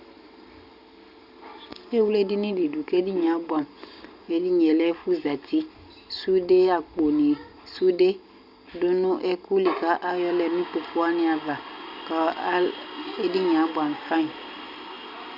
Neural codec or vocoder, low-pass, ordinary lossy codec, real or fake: none; 5.4 kHz; Opus, 64 kbps; real